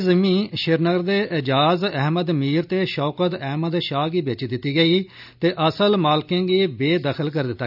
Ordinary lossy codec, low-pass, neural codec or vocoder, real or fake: none; 5.4 kHz; none; real